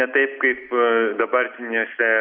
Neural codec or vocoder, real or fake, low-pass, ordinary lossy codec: none; real; 5.4 kHz; MP3, 48 kbps